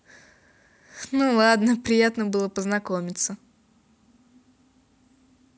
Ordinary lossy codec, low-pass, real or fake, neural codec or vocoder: none; none; real; none